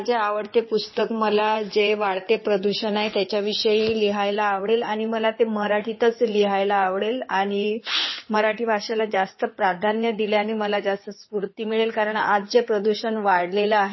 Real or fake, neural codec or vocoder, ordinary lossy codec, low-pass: fake; codec, 16 kHz in and 24 kHz out, 2.2 kbps, FireRedTTS-2 codec; MP3, 24 kbps; 7.2 kHz